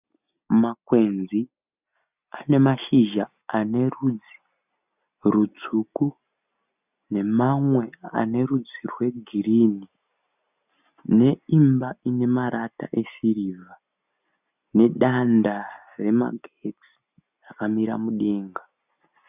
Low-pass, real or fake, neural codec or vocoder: 3.6 kHz; real; none